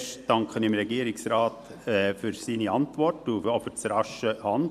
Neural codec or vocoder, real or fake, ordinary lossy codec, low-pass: vocoder, 44.1 kHz, 128 mel bands every 512 samples, BigVGAN v2; fake; none; 14.4 kHz